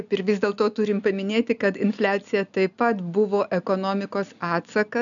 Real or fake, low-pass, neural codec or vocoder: real; 7.2 kHz; none